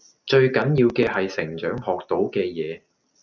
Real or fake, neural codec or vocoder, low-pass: real; none; 7.2 kHz